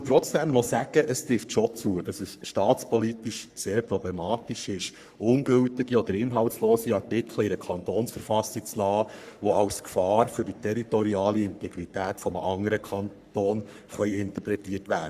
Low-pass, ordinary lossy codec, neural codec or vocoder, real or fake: 14.4 kHz; Opus, 64 kbps; codec, 44.1 kHz, 3.4 kbps, Pupu-Codec; fake